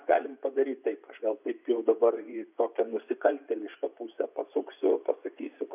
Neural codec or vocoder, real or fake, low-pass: vocoder, 24 kHz, 100 mel bands, Vocos; fake; 3.6 kHz